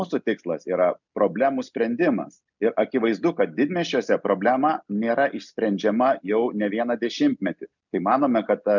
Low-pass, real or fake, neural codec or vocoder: 7.2 kHz; real; none